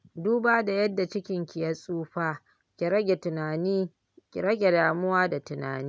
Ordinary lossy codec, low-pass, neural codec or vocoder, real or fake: none; none; none; real